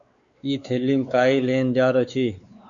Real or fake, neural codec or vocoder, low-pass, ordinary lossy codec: fake; codec, 16 kHz, 4 kbps, X-Codec, WavLM features, trained on Multilingual LibriSpeech; 7.2 kHz; Opus, 64 kbps